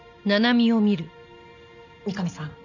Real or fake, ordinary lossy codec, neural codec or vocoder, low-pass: real; none; none; 7.2 kHz